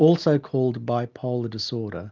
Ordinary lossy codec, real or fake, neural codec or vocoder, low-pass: Opus, 32 kbps; real; none; 7.2 kHz